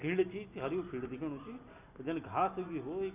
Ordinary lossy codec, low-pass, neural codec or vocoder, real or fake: none; 3.6 kHz; none; real